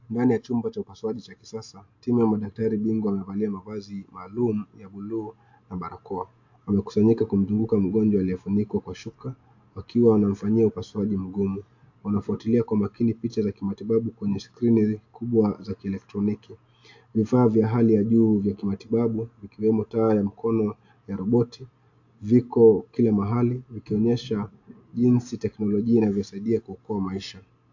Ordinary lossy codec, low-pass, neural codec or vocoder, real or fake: AAC, 48 kbps; 7.2 kHz; none; real